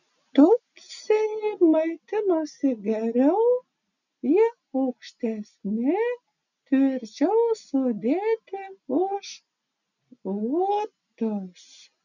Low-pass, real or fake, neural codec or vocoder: 7.2 kHz; real; none